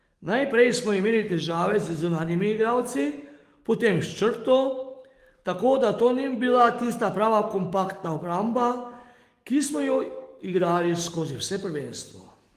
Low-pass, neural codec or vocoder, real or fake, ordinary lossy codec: 14.4 kHz; codec, 44.1 kHz, 7.8 kbps, DAC; fake; Opus, 24 kbps